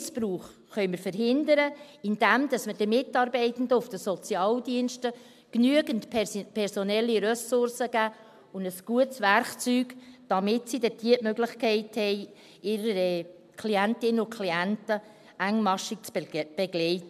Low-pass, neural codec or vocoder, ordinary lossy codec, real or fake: 14.4 kHz; none; MP3, 96 kbps; real